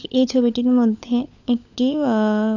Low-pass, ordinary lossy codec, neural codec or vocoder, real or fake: 7.2 kHz; none; codec, 16 kHz, 16 kbps, FunCodec, trained on LibriTTS, 50 frames a second; fake